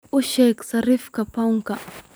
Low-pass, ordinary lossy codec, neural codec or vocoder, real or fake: none; none; vocoder, 44.1 kHz, 128 mel bands every 256 samples, BigVGAN v2; fake